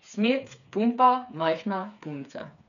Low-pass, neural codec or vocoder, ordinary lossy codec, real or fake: 7.2 kHz; codec, 16 kHz, 4 kbps, FreqCodec, smaller model; none; fake